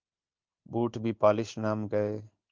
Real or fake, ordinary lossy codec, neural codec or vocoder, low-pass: fake; Opus, 32 kbps; codec, 16 kHz in and 24 kHz out, 1 kbps, XY-Tokenizer; 7.2 kHz